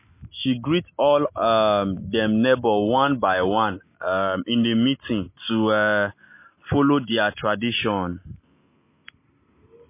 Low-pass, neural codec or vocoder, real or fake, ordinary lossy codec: 3.6 kHz; none; real; MP3, 24 kbps